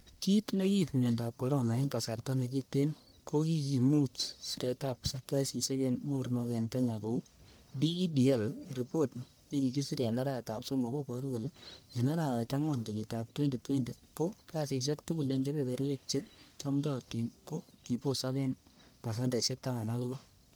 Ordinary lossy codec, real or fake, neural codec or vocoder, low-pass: none; fake; codec, 44.1 kHz, 1.7 kbps, Pupu-Codec; none